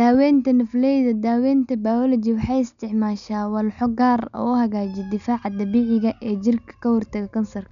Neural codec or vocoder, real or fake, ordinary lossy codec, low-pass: none; real; none; 7.2 kHz